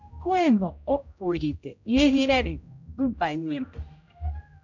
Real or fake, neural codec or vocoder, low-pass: fake; codec, 16 kHz, 0.5 kbps, X-Codec, HuBERT features, trained on general audio; 7.2 kHz